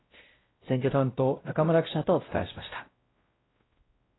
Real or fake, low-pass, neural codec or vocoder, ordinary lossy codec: fake; 7.2 kHz; codec, 16 kHz, 0.5 kbps, X-Codec, WavLM features, trained on Multilingual LibriSpeech; AAC, 16 kbps